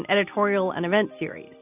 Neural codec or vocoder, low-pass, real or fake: none; 3.6 kHz; real